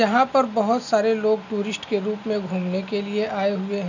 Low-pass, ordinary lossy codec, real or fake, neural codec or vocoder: 7.2 kHz; none; real; none